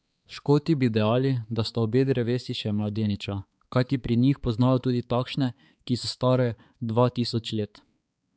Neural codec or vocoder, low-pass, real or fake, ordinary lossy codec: codec, 16 kHz, 4 kbps, X-Codec, HuBERT features, trained on balanced general audio; none; fake; none